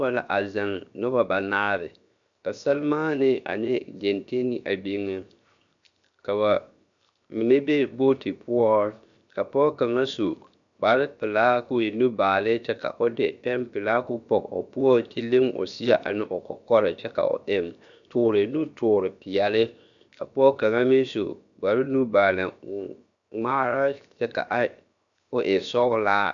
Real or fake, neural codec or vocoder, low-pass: fake; codec, 16 kHz, 0.7 kbps, FocalCodec; 7.2 kHz